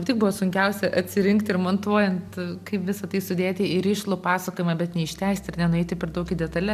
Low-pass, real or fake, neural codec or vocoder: 14.4 kHz; real; none